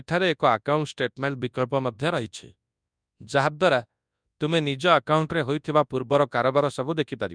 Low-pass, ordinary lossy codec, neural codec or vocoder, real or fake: 9.9 kHz; none; codec, 24 kHz, 0.9 kbps, WavTokenizer, large speech release; fake